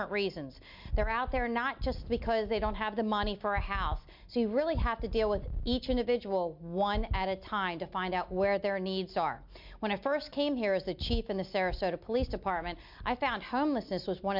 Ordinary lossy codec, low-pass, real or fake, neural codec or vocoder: MP3, 48 kbps; 5.4 kHz; real; none